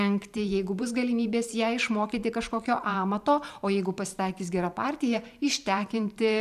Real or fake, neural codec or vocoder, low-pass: fake; vocoder, 44.1 kHz, 128 mel bands every 512 samples, BigVGAN v2; 14.4 kHz